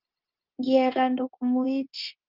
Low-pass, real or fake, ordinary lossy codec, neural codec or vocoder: 5.4 kHz; fake; Opus, 32 kbps; codec, 16 kHz, 0.9 kbps, LongCat-Audio-Codec